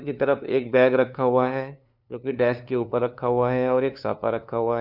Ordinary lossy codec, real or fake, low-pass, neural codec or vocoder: none; fake; 5.4 kHz; codec, 16 kHz, 2 kbps, FunCodec, trained on LibriTTS, 25 frames a second